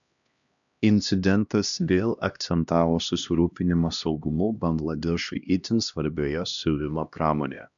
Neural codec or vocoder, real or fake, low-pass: codec, 16 kHz, 1 kbps, X-Codec, HuBERT features, trained on LibriSpeech; fake; 7.2 kHz